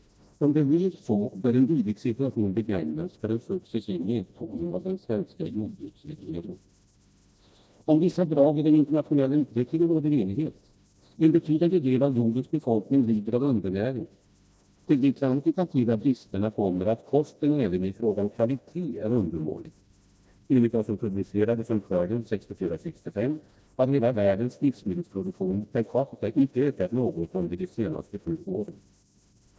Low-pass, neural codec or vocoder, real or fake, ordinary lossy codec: none; codec, 16 kHz, 1 kbps, FreqCodec, smaller model; fake; none